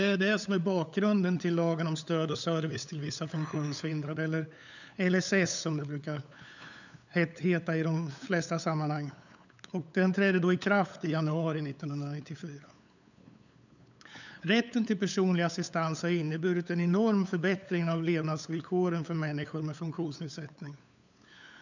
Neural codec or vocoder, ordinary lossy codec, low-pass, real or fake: codec, 16 kHz, 8 kbps, FunCodec, trained on LibriTTS, 25 frames a second; none; 7.2 kHz; fake